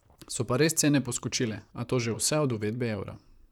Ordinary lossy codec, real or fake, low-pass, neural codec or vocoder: none; fake; 19.8 kHz; vocoder, 44.1 kHz, 128 mel bands, Pupu-Vocoder